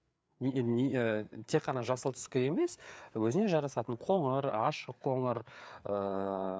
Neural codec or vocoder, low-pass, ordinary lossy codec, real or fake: codec, 16 kHz, 4 kbps, FreqCodec, larger model; none; none; fake